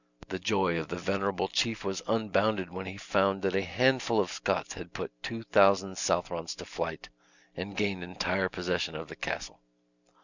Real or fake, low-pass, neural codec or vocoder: real; 7.2 kHz; none